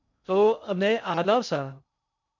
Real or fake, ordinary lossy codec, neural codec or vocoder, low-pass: fake; MP3, 64 kbps; codec, 16 kHz in and 24 kHz out, 0.6 kbps, FocalCodec, streaming, 2048 codes; 7.2 kHz